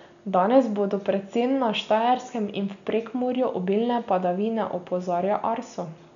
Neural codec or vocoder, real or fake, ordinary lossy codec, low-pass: none; real; none; 7.2 kHz